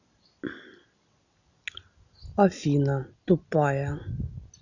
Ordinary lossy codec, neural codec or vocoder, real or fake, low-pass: none; none; real; 7.2 kHz